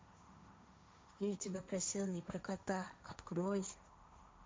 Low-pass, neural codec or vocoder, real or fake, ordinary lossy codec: none; codec, 16 kHz, 1.1 kbps, Voila-Tokenizer; fake; none